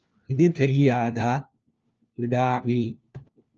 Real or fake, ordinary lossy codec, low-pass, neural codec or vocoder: fake; Opus, 24 kbps; 7.2 kHz; codec, 16 kHz, 1 kbps, FunCodec, trained on LibriTTS, 50 frames a second